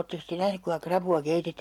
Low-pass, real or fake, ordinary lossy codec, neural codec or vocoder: 19.8 kHz; real; MP3, 96 kbps; none